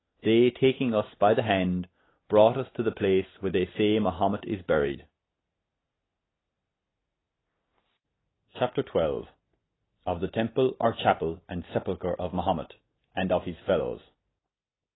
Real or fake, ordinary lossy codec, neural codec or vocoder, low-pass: real; AAC, 16 kbps; none; 7.2 kHz